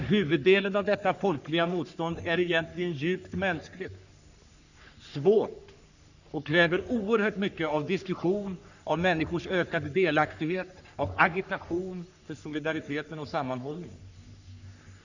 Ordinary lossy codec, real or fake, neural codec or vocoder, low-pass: none; fake; codec, 44.1 kHz, 3.4 kbps, Pupu-Codec; 7.2 kHz